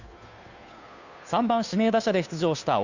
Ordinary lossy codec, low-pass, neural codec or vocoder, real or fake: none; 7.2 kHz; codec, 16 kHz, 2 kbps, FunCodec, trained on Chinese and English, 25 frames a second; fake